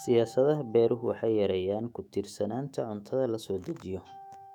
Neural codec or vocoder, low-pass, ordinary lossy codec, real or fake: autoencoder, 48 kHz, 128 numbers a frame, DAC-VAE, trained on Japanese speech; 19.8 kHz; none; fake